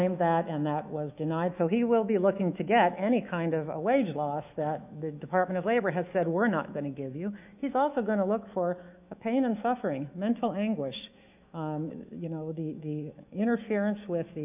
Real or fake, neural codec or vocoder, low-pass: fake; autoencoder, 48 kHz, 128 numbers a frame, DAC-VAE, trained on Japanese speech; 3.6 kHz